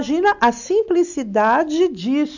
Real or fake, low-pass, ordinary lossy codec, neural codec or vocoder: real; 7.2 kHz; none; none